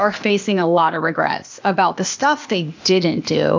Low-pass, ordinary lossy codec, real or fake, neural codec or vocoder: 7.2 kHz; MP3, 64 kbps; fake; codec, 16 kHz, 0.8 kbps, ZipCodec